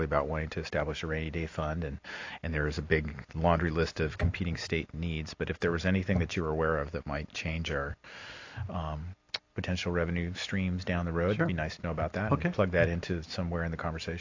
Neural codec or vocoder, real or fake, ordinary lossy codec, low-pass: none; real; AAC, 48 kbps; 7.2 kHz